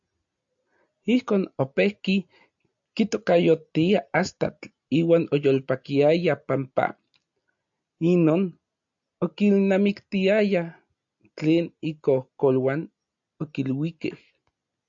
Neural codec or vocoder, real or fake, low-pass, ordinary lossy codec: none; real; 7.2 kHz; AAC, 64 kbps